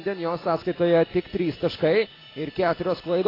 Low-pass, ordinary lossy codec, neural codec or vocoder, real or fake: 5.4 kHz; AAC, 24 kbps; none; real